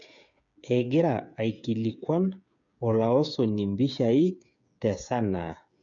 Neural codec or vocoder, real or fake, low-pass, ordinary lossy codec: codec, 16 kHz, 8 kbps, FreqCodec, smaller model; fake; 7.2 kHz; none